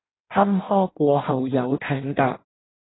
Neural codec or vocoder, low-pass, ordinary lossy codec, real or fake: codec, 16 kHz in and 24 kHz out, 0.6 kbps, FireRedTTS-2 codec; 7.2 kHz; AAC, 16 kbps; fake